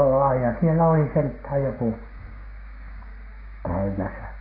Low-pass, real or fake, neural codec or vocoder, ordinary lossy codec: 5.4 kHz; fake; codec, 16 kHz, 6 kbps, DAC; AAC, 24 kbps